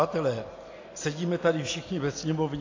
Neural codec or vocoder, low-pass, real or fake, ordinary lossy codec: none; 7.2 kHz; real; AAC, 32 kbps